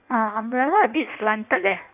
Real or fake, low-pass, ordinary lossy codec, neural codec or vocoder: fake; 3.6 kHz; AAC, 32 kbps; codec, 16 kHz in and 24 kHz out, 1.1 kbps, FireRedTTS-2 codec